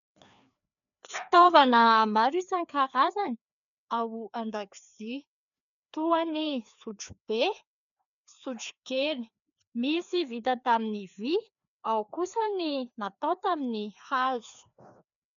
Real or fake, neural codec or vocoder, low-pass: fake; codec, 16 kHz, 2 kbps, FreqCodec, larger model; 7.2 kHz